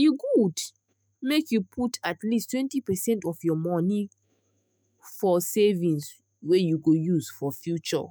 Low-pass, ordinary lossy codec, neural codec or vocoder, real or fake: none; none; autoencoder, 48 kHz, 128 numbers a frame, DAC-VAE, trained on Japanese speech; fake